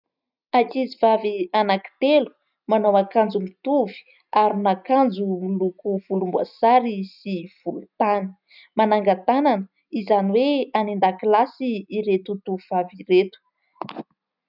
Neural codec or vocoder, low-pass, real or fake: none; 5.4 kHz; real